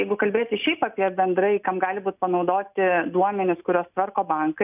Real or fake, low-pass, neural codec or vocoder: real; 3.6 kHz; none